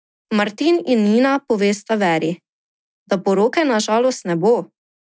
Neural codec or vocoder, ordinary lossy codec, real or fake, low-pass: none; none; real; none